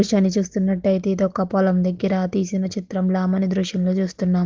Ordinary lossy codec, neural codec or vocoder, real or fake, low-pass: Opus, 16 kbps; none; real; 7.2 kHz